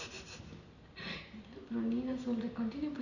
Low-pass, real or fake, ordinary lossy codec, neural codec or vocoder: 7.2 kHz; real; none; none